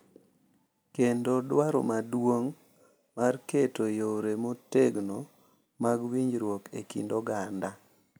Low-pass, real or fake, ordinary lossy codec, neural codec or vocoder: none; real; none; none